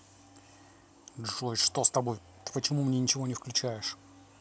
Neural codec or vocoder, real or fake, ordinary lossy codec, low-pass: none; real; none; none